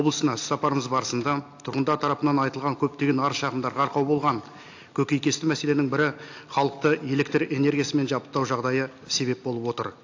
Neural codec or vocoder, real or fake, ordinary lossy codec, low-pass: none; real; AAC, 48 kbps; 7.2 kHz